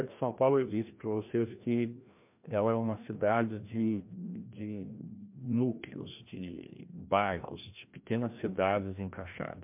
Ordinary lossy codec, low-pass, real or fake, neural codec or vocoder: MP3, 32 kbps; 3.6 kHz; fake; codec, 16 kHz, 1 kbps, FreqCodec, larger model